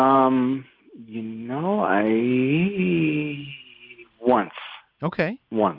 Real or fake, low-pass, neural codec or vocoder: real; 5.4 kHz; none